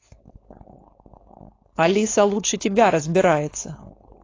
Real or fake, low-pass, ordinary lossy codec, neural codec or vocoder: fake; 7.2 kHz; AAC, 32 kbps; codec, 16 kHz, 4.8 kbps, FACodec